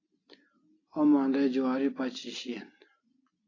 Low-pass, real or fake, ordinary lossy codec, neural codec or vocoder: 7.2 kHz; real; AAC, 32 kbps; none